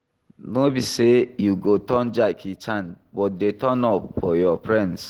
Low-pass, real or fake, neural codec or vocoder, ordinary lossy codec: 19.8 kHz; real; none; Opus, 16 kbps